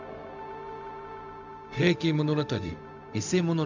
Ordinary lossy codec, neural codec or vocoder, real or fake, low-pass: none; codec, 16 kHz, 0.4 kbps, LongCat-Audio-Codec; fake; 7.2 kHz